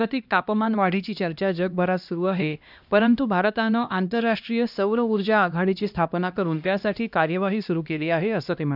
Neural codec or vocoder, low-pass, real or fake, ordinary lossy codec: codec, 16 kHz, 1 kbps, X-Codec, HuBERT features, trained on LibriSpeech; 5.4 kHz; fake; none